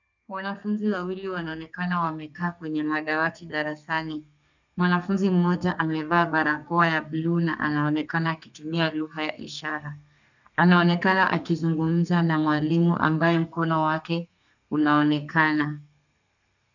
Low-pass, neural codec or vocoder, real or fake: 7.2 kHz; codec, 32 kHz, 1.9 kbps, SNAC; fake